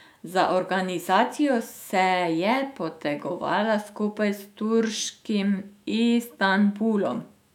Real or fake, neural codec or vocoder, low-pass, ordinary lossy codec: fake; autoencoder, 48 kHz, 128 numbers a frame, DAC-VAE, trained on Japanese speech; 19.8 kHz; none